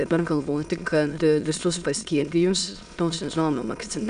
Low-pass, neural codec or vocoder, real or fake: 9.9 kHz; autoencoder, 22.05 kHz, a latent of 192 numbers a frame, VITS, trained on many speakers; fake